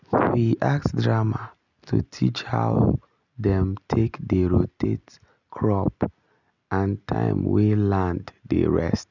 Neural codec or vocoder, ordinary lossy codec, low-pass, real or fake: none; none; 7.2 kHz; real